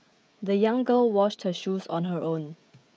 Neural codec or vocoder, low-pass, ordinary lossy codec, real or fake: codec, 16 kHz, 16 kbps, FreqCodec, smaller model; none; none; fake